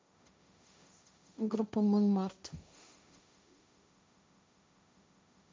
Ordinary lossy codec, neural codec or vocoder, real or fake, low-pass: none; codec, 16 kHz, 1.1 kbps, Voila-Tokenizer; fake; none